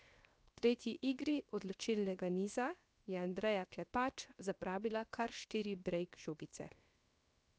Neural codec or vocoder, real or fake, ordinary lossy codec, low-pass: codec, 16 kHz, 0.7 kbps, FocalCodec; fake; none; none